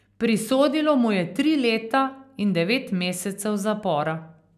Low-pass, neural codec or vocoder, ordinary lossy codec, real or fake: 14.4 kHz; none; none; real